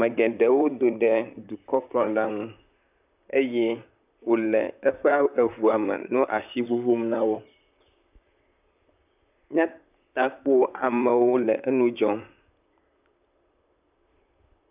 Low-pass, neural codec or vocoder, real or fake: 3.6 kHz; vocoder, 44.1 kHz, 128 mel bands, Pupu-Vocoder; fake